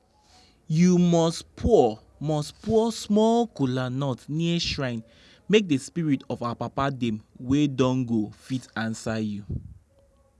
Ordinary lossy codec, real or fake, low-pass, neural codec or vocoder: none; real; none; none